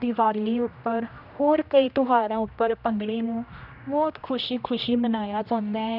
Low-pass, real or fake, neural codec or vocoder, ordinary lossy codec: 5.4 kHz; fake; codec, 16 kHz, 1 kbps, X-Codec, HuBERT features, trained on general audio; none